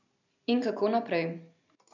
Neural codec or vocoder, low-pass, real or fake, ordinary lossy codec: none; 7.2 kHz; real; none